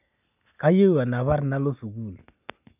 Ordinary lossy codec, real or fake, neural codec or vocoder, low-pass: none; real; none; 3.6 kHz